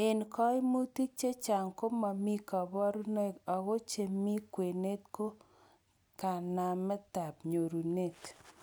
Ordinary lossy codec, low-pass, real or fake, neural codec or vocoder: none; none; real; none